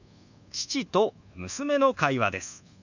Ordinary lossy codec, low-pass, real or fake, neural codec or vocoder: none; 7.2 kHz; fake; codec, 24 kHz, 1.2 kbps, DualCodec